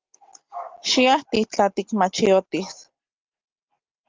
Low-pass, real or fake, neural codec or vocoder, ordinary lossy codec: 7.2 kHz; real; none; Opus, 32 kbps